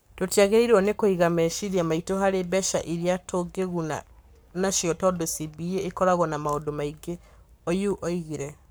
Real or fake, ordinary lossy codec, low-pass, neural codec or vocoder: fake; none; none; codec, 44.1 kHz, 7.8 kbps, Pupu-Codec